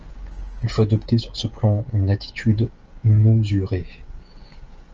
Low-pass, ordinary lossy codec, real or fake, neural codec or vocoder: 7.2 kHz; Opus, 24 kbps; fake; codec, 16 kHz, 16 kbps, FreqCodec, smaller model